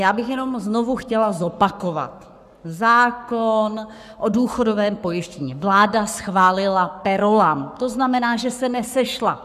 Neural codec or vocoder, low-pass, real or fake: codec, 44.1 kHz, 7.8 kbps, Pupu-Codec; 14.4 kHz; fake